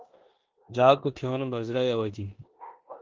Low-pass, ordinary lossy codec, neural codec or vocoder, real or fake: 7.2 kHz; Opus, 16 kbps; codec, 16 kHz in and 24 kHz out, 0.9 kbps, LongCat-Audio-Codec, four codebook decoder; fake